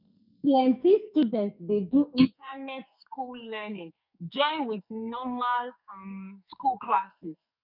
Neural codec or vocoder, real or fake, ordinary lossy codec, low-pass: codec, 44.1 kHz, 2.6 kbps, SNAC; fake; none; 5.4 kHz